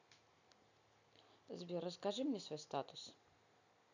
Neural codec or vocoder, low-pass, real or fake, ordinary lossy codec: none; 7.2 kHz; real; none